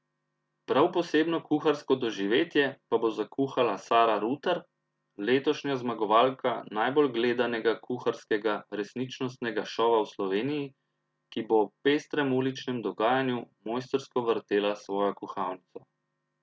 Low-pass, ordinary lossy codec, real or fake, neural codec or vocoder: none; none; real; none